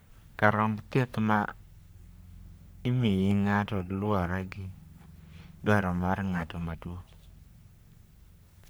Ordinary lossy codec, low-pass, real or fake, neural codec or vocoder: none; none; fake; codec, 44.1 kHz, 3.4 kbps, Pupu-Codec